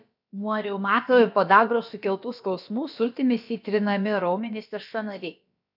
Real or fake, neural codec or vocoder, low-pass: fake; codec, 16 kHz, about 1 kbps, DyCAST, with the encoder's durations; 5.4 kHz